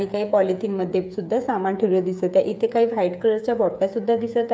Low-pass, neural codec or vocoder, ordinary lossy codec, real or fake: none; codec, 16 kHz, 8 kbps, FreqCodec, smaller model; none; fake